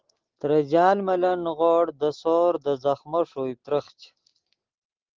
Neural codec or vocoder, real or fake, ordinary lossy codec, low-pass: none; real; Opus, 16 kbps; 7.2 kHz